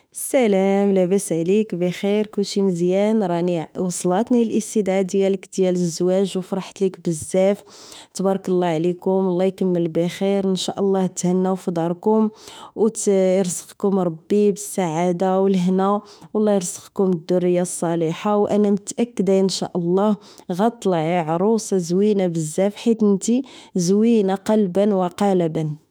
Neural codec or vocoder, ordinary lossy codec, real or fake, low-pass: autoencoder, 48 kHz, 32 numbers a frame, DAC-VAE, trained on Japanese speech; none; fake; none